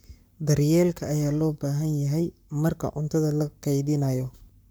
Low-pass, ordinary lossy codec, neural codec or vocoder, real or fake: none; none; codec, 44.1 kHz, 7.8 kbps, Pupu-Codec; fake